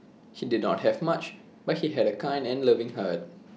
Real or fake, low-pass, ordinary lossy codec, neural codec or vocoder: real; none; none; none